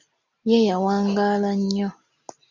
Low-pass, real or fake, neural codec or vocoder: 7.2 kHz; real; none